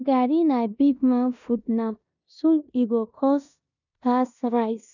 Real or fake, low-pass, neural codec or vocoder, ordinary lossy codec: fake; 7.2 kHz; codec, 16 kHz in and 24 kHz out, 0.9 kbps, LongCat-Audio-Codec, four codebook decoder; none